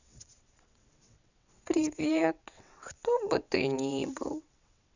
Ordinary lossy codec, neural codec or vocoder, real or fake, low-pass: none; vocoder, 22.05 kHz, 80 mel bands, WaveNeXt; fake; 7.2 kHz